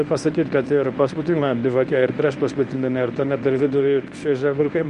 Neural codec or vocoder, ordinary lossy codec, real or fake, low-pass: codec, 24 kHz, 0.9 kbps, WavTokenizer, medium speech release version 1; Opus, 64 kbps; fake; 10.8 kHz